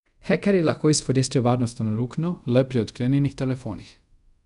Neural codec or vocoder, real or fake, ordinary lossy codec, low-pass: codec, 24 kHz, 0.5 kbps, DualCodec; fake; none; 10.8 kHz